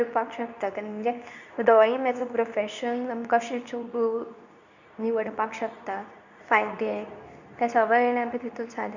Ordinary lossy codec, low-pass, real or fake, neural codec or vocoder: none; 7.2 kHz; fake; codec, 24 kHz, 0.9 kbps, WavTokenizer, medium speech release version 2